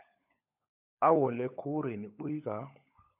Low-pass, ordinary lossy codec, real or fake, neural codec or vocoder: 3.6 kHz; AAC, 32 kbps; fake; codec, 16 kHz, 16 kbps, FunCodec, trained on LibriTTS, 50 frames a second